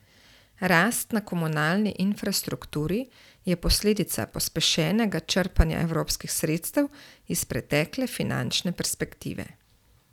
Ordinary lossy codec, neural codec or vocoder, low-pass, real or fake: none; none; 19.8 kHz; real